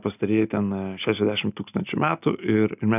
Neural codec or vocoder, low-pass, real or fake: none; 3.6 kHz; real